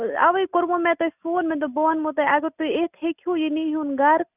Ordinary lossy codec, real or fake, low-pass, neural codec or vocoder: none; real; 3.6 kHz; none